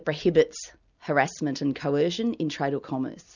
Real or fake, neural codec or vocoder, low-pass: real; none; 7.2 kHz